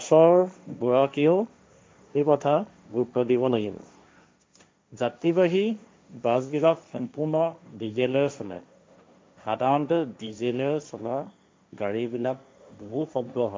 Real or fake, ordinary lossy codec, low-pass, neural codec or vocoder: fake; none; none; codec, 16 kHz, 1.1 kbps, Voila-Tokenizer